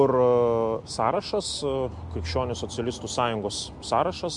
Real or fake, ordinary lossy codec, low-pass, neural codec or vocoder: real; MP3, 64 kbps; 10.8 kHz; none